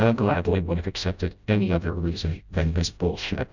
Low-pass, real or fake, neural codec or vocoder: 7.2 kHz; fake; codec, 16 kHz, 0.5 kbps, FreqCodec, smaller model